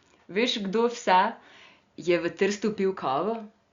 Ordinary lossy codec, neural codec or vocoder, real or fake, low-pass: Opus, 64 kbps; none; real; 7.2 kHz